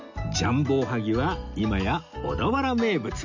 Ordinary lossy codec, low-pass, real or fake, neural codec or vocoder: none; 7.2 kHz; real; none